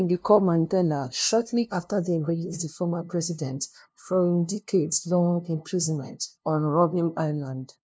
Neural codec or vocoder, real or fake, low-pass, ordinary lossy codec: codec, 16 kHz, 0.5 kbps, FunCodec, trained on LibriTTS, 25 frames a second; fake; none; none